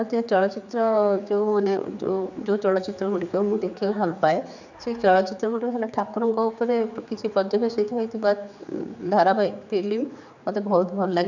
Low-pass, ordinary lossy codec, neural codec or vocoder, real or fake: 7.2 kHz; none; codec, 16 kHz, 4 kbps, X-Codec, HuBERT features, trained on general audio; fake